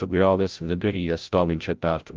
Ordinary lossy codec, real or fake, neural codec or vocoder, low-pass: Opus, 32 kbps; fake; codec, 16 kHz, 0.5 kbps, FreqCodec, larger model; 7.2 kHz